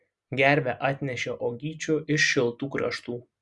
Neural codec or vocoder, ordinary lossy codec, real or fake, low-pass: none; Opus, 64 kbps; real; 10.8 kHz